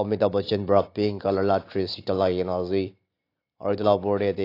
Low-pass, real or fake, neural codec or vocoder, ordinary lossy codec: 5.4 kHz; real; none; AAC, 32 kbps